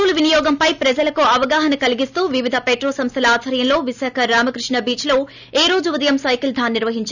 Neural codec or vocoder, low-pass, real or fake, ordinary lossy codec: none; 7.2 kHz; real; none